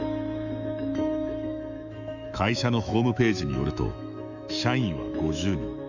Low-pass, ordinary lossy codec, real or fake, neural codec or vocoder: 7.2 kHz; none; fake; autoencoder, 48 kHz, 128 numbers a frame, DAC-VAE, trained on Japanese speech